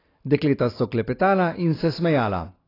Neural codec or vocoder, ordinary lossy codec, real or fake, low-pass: none; AAC, 24 kbps; real; 5.4 kHz